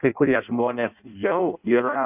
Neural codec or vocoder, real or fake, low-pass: codec, 16 kHz in and 24 kHz out, 0.6 kbps, FireRedTTS-2 codec; fake; 3.6 kHz